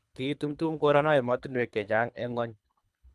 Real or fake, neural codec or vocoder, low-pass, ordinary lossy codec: fake; codec, 24 kHz, 3 kbps, HILCodec; none; none